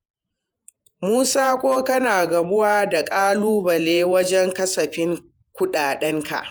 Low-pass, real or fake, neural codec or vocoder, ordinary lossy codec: none; fake; vocoder, 48 kHz, 128 mel bands, Vocos; none